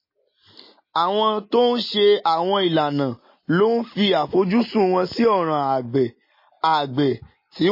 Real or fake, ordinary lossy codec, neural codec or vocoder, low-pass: real; MP3, 24 kbps; none; 5.4 kHz